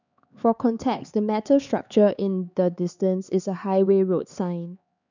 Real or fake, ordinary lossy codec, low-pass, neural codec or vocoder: fake; none; 7.2 kHz; codec, 16 kHz, 4 kbps, X-Codec, HuBERT features, trained on LibriSpeech